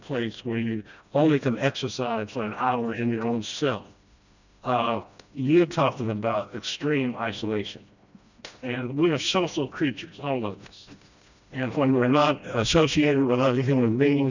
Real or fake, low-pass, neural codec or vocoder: fake; 7.2 kHz; codec, 16 kHz, 1 kbps, FreqCodec, smaller model